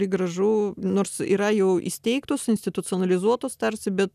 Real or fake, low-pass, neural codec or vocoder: real; 14.4 kHz; none